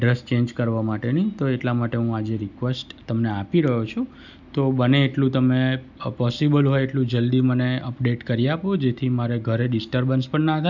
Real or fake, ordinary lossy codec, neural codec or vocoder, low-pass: real; none; none; 7.2 kHz